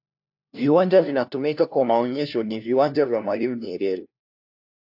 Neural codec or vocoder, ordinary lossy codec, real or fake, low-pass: codec, 16 kHz, 1 kbps, FunCodec, trained on LibriTTS, 50 frames a second; AAC, 48 kbps; fake; 5.4 kHz